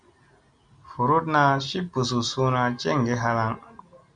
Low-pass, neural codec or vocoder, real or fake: 9.9 kHz; none; real